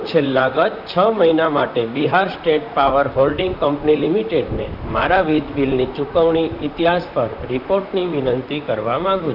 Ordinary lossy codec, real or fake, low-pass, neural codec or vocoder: none; fake; 5.4 kHz; vocoder, 44.1 kHz, 128 mel bands, Pupu-Vocoder